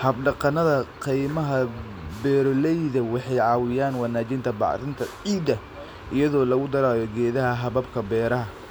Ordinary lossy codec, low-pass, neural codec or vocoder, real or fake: none; none; none; real